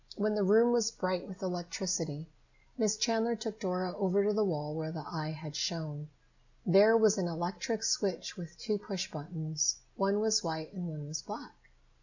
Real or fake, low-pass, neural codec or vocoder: real; 7.2 kHz; none